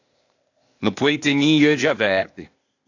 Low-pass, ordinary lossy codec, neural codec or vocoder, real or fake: 7.2 kHz; AAC, 48 kbps; codec, 16 kHz, 0.8 kbps, ZipCodec; fake